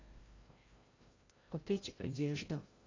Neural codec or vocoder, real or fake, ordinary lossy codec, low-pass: codec, 16 kHz, 0.5 kbps, FreqCodec, larger model; fake; AAC, 32 kbps; 7.2 kHz